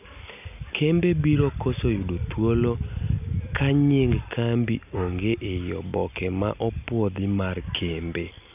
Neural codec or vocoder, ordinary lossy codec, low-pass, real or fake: none; none; 3.6 kHz; real